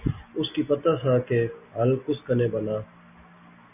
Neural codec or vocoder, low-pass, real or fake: none; 3.6 kHz; real